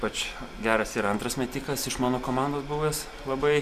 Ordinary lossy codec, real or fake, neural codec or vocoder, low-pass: MP3, 96 kbps; real; none; 14.4 kHz